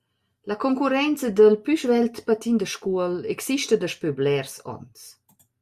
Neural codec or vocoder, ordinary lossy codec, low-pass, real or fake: none; Opus, 64 kbps; 14.4 kHz; real